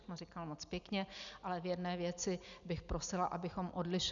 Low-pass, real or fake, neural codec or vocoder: 7.2 kHz; real; none